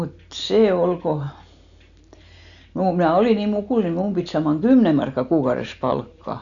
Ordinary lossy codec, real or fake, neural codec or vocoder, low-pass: none; real; none; 7.2 kHz